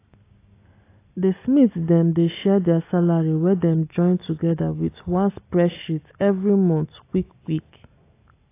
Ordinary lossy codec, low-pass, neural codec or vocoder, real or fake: AAC, 24 kbps; 3.6 kHz; none; real